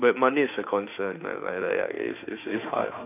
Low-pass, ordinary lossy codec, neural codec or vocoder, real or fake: 3.6 kHz; none; autoencoder, 48 kHz, 32 numbers a frame, DAC-VAE, trained on Japanese speech; fake